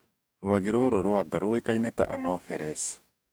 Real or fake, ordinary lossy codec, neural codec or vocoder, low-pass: fake; none; codec, 44.1 kHz, 2.6 kbps, DAC; none